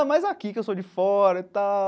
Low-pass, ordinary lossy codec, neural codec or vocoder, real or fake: none; none; none; real